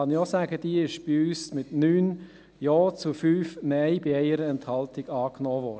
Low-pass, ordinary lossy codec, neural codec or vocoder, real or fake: none; none; none; real